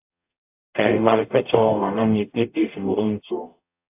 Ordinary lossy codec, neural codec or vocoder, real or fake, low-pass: AAC, 32 kbps; codec, 44.1 kHz, 0.9 kbps, DAC; fake; 3.6 kHz